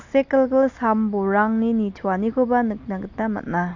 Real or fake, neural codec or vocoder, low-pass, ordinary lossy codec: real; none; 7.2 kHz; AAC, 48 kbps